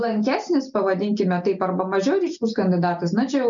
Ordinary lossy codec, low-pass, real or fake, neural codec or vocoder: MP3, 96 kbps; 7.2 kHz; real; none